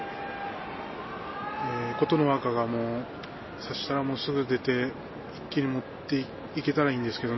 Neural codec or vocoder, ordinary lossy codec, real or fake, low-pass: none; MP3, 24 kbps; real; 7.2 kHz